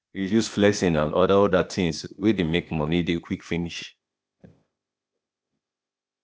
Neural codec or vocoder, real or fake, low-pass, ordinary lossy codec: codec, 16 kHz, 0.8 kbps, ZipCodec; fake; none; none